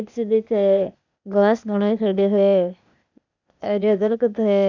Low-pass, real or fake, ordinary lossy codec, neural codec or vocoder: 7.2 kHz; fake; none; codec, 16 kHz, 0.8 kbps, ZipCodec